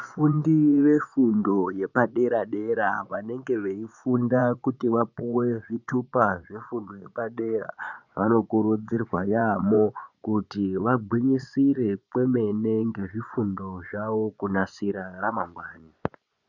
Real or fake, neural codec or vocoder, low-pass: fake; vocoder, 24 kHz, 100 mel bands, Vocos; 7.2 kHz